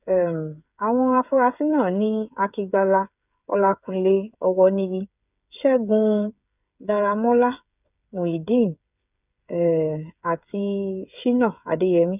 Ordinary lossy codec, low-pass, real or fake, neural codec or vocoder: none; 3.6 kHz; fake; codec, 16 kHz, 8 kbps, FreqCodec, smaller model